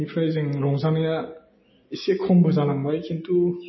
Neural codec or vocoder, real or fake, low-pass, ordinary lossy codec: none; real; 7.2 kHz; MP3, 24 kbps